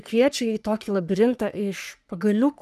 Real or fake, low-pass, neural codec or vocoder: fake; 14.4 kHz; codec, 44.1 kHz, 3.4 kbps, Pupu-Codec